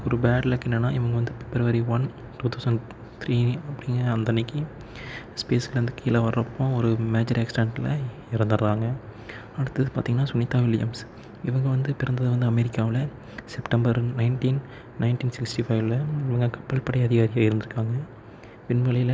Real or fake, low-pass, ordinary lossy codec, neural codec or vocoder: real; none; none; none